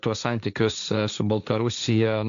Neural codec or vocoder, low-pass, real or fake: codec, 16 kHz, 1.1 kbps, Voila-Tokenizer; 7.2 kHz; fake